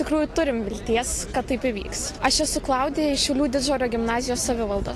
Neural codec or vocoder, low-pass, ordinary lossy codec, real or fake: none; 14.4 kHz; AAC, 48 kbps; real